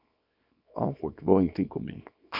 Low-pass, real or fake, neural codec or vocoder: 5.4 kHz; fake; codec, 24 kHz, 0.9 kbps, WavTokenizer, small release